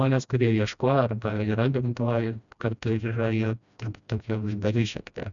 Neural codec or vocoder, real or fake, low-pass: codec, 16 kHz, 1 kbps, FreqCodec, smaller model; fake; 7.2 kHz